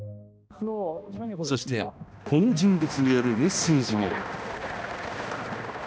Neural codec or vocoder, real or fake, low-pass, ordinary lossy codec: codec, 16 kHz, 1 kbps, X-Codec, HuBERT features, trained on balanced general audio; fake; none; none